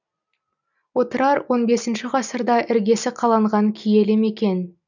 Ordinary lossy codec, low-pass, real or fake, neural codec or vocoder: none; 7.2 kHz; real; none